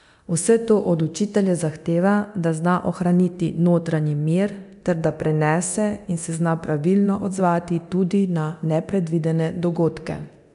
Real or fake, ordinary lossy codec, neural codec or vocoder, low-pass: fake; none; codec, 24 kHz, 0.9 kbps, DualCodec; 10.8 kHz